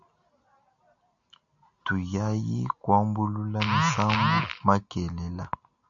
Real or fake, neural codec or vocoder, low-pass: real; none; 7.2 kHz